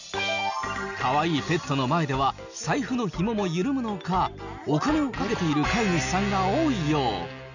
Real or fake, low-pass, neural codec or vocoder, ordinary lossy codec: real; 7.2 kHz; none; none